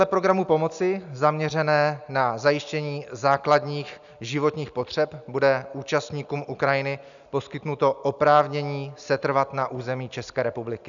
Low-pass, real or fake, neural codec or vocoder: 7.2 kHz; real; none